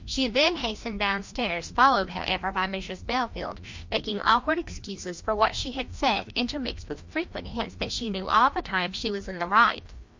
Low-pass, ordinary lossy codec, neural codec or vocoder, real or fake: 7.2 kHz; MP3, 48 kbps; codec, 16 kHz, 1 kbps, FreqCodec, larger model; fake